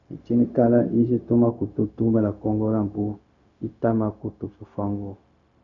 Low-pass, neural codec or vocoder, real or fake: 7.2 kHz; codec, 16 kHz, 0.4 kbps, LongCat-Audio-Codec; fake